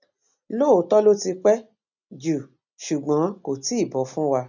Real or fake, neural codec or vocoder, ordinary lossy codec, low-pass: real; none; none; 7.2 kHz